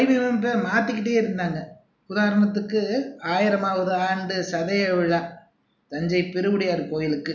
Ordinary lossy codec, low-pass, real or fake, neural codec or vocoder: none; 7.2 kHz; real; none